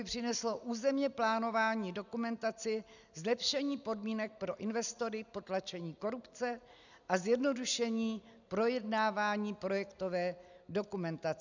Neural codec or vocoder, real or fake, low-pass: none; real; 7.2 kHz